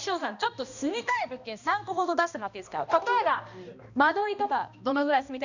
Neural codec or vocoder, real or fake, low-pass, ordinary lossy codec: codec, 16 kHz, 1 kbps, X-Codec, HuBERT features, trained on balanced general audio; fake; 7.2 kHz; none